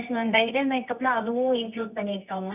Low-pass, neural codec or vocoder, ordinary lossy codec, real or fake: 3.6 kHz; codec, 24 kHz, 0.9 kbps, WavTokenizer, medium music audio release; none; fake